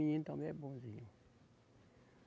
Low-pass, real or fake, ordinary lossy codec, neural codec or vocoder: none; real; none; none